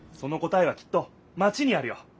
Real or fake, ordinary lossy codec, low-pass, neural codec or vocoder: real; none; none; none